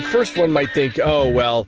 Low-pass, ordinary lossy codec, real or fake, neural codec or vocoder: 7.2 kHz; Opus, 16 kbps; real; none